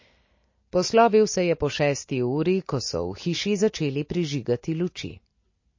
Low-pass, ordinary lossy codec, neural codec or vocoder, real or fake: 7.2 kHz; MP3, 32 kbps; none; real